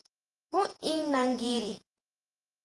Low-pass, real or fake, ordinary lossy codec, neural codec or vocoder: 10.8 kHz; fake; Opus, 24 kbps; vocoder, 48 kHz, 128 mel bands, Vocos